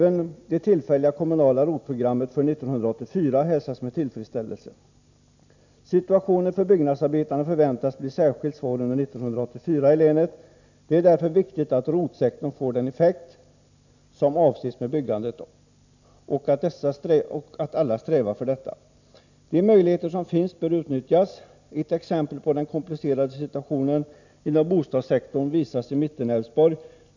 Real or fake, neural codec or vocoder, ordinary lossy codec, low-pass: real; none; none; 7.2 kHz